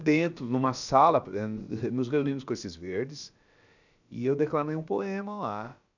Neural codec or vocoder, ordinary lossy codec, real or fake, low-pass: codec, 16 kHz, about 1 kbps, DyCAST, with the encoder's durations; none; fake; 7.2 kHz